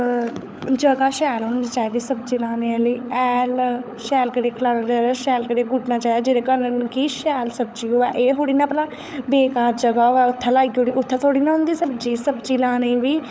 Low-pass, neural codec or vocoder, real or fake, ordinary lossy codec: none; codec, 16 kHz, 16 kbps, FunCodec, trained on LibriTTS, 50 frames a second; fake; none